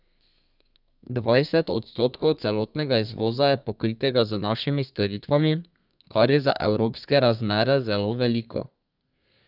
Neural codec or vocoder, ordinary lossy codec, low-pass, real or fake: codec, 32 kHz, 1.9 kbps, SNAC; none; 5.4 kHz; fake